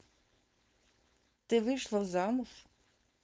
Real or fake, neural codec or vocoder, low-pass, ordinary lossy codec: fake; codec, 16 kHz, 4.8 kbps, FACodec; none; none